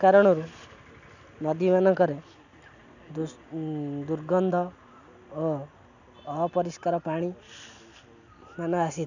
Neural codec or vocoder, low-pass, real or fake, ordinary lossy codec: none; 7.2 kHz; real; none